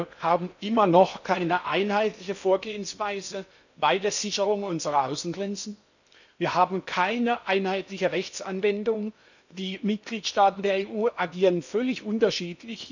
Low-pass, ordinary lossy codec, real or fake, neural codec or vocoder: 7.2 kHz; none; fake; codec, 16 kHz in and 24 kHz out, 0.8 kbps, FocalCodec, streaming, 65536 codes